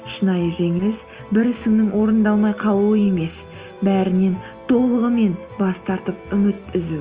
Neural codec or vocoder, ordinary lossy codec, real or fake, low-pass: none; Opus, 24 kbps; real; 3.6 kHz